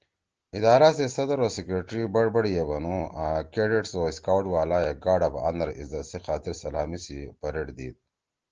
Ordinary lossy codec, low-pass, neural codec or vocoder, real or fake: Opus, 16 kbps; 7.2 kHz; none; real